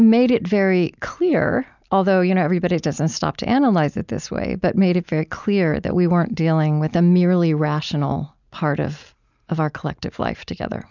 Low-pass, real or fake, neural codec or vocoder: 7.2 kHz; real; none